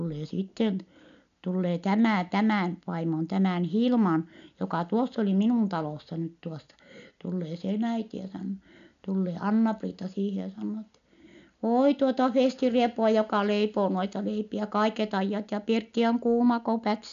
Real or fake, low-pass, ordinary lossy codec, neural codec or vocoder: real; 7.2 kHz; none; none